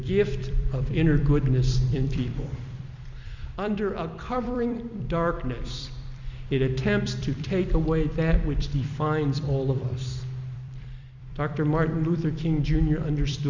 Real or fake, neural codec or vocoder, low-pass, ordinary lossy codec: fake; vocoder, 44.1 kHz, 128 mel bands every 256 samples, BigVGAN v2; 7.2 kHz; Opus, 64 kbps